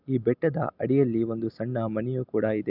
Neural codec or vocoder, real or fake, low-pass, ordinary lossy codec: none; real; 5.4 kHz; none